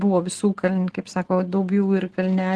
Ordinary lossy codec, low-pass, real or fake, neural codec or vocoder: Opus, 16 kbps; 10.8 kHz; real; none